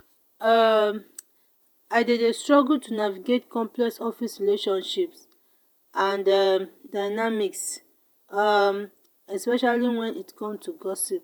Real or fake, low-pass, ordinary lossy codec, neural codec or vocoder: fake; none; none; vocoder, 48 kHz, 128 mel bands, Vocos